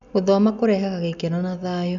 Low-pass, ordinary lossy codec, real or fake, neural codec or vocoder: 7.2 kHz; none; real; none